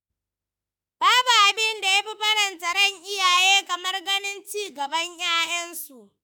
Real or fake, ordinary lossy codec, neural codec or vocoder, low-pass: fake; none; autoencoder, 48 kHz, 32 numbers a frame, DAC-VAE, trained on Japanese speech; none